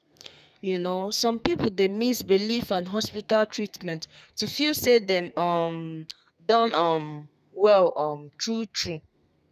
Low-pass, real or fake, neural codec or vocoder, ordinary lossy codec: 14.4 kHz; fake; codec, 32 kHz, 1.9 kbps, SNAC; none